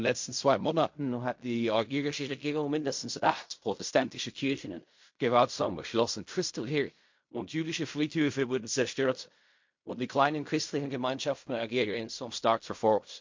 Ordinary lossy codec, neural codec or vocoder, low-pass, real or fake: MP3, 48 kbps; codec, 16 kHz in and 24 kHz out, 0.4 kbps, LongCat-Audio-Codec, fine tuned four codebook decoder; 7.2 kHz; fake